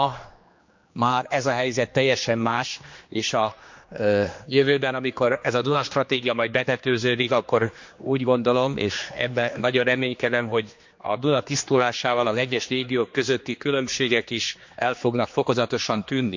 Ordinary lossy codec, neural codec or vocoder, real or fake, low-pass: MP3, 48 kbps; codec, 16 kHz, 2 kbps, X-Codec, HuBERT features, trained on general audio; fake; 7.2 kHz